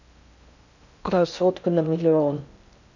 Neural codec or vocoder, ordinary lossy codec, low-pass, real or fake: codec, 16 kHz in and 24 kHz out, 0.6 kbps, FocalCodec, streaming, 2048 codes; none; 7.2 kHz; fake